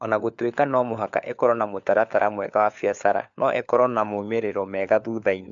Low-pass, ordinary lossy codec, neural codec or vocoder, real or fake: 7.2 kHz; MP3, 64 kbps; codec, 16 kHz, 4 kbps, FunCodec, trained on LibriTTS, 50 frames a second; fake